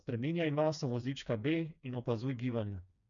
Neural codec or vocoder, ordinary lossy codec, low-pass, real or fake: codec, 16 kHz, 2 kbps, FreqCodec, smaller model; none; 7.2 kHz; fake